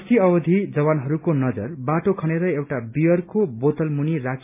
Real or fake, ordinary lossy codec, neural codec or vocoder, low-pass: real; MP3, 32 kbps; none; 3.6 kHz